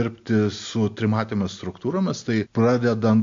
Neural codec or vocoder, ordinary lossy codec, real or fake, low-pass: none; MP3, 48 kbps; real; 7.2 kHz